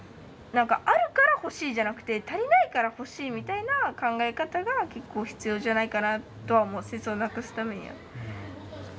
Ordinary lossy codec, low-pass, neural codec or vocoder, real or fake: none; none; none; real